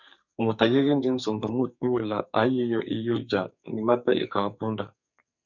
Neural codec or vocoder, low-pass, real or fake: codec, 44.1 kHz, 2.6 kbps, SNAC; 7.2 kHz; fake